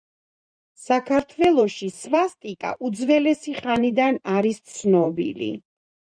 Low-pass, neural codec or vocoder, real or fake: 9.9 kHz; vocoder, 44.1 kHz, 128 mel bands every 512 samples, BigVGAN v2; fake